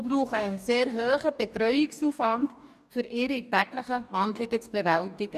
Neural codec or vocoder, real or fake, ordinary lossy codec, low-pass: codec, 44.1 kHz, 2.6 kbps, DAC; fake; none; 14.4 kHz